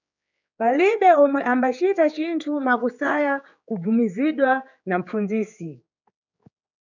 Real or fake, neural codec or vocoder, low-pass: fake; codec, 16 kHz, 4 kbps, X-Codec, HuBERT features, trained on general audio; 7.2 kHz